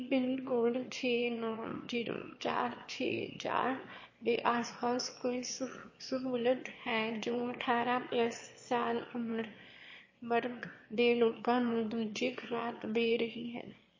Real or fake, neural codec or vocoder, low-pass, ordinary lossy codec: fake; autoencoder, 22.05 kHz, a latent of 192 numbers a frame, VITS, trained on one speaker; 7.2 kHz; MP3, 32 kbps